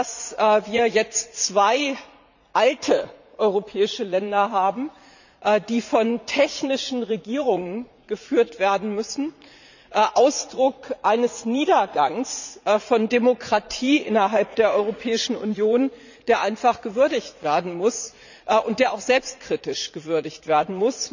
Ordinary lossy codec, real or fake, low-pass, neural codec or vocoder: none; fake; 7.2 kHz; vocoder, 44.1 kHz, 80 mel bands, Vocos